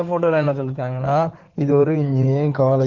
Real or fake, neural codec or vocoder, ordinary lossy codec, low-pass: fake; codec, 16 kHz in and 24 kHz out, 2.2 kbps, FireRedTTS-2 codec; Opus, 32 kbps; 7.2 kHz